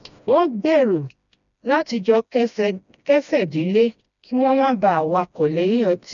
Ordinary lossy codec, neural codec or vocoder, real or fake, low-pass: none; codec, 16 kHz, 1 kbps, FreqCodec, smaller model; fake; 7.2 kHz